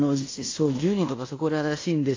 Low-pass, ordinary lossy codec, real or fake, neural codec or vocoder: 7.2 kHz; AAC, 32 kbps; fake; codec, 16 kHz in and 24 kHz out, 0.9 kbps, LongCat-Audio-Codec, fine tuned four codebook decoder